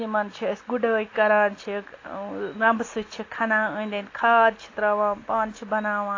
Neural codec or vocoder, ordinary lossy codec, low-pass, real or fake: none; AAC, 32 kbps; 7.2 kHz; real